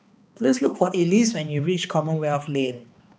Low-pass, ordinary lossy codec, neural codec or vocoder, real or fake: none; none; codec, 16 kHz, 2 kbps, X-Codec, HuBERT features, trained on balanced general audio; fake